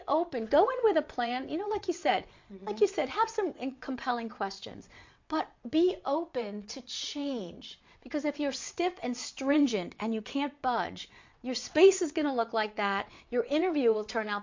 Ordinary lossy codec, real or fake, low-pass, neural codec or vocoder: MP3, 48 kbps; fake; 7.2 kHz; vocoder, 22.05 kHz, 80 mel bands, WaveNeXt